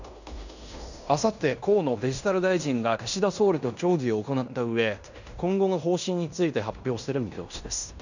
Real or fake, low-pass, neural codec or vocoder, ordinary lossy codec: fake; 7.2 kHz; codec, 16 kHz in and 24 kHz out, 0.9 kbps, LongCat-Audio-Codec, fine tuned four codebook decoder; none